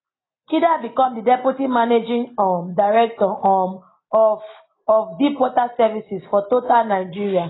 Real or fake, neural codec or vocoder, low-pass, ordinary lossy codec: real; none; 7.2 kHz; AAC, 16 kbps